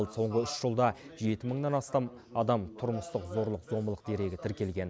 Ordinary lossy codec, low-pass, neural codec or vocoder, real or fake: none; none; none; real